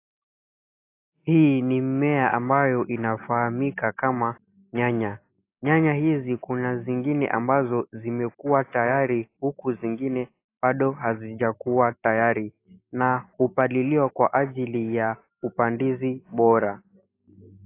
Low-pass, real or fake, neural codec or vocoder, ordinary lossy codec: 3.6 kHz; real; none; AAC, 24 kbps